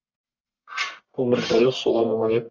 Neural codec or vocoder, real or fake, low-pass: codec, 44.1 kHz, 1.7 kbps, Pupu-Codec; fake; 7.2 kHz